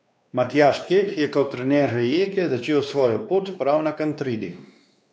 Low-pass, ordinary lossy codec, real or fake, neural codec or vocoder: none; none; fake; codec, 16 kHz, 2 kbps, X-Codec, WavLM features, trained on Multilingual LibriSpeech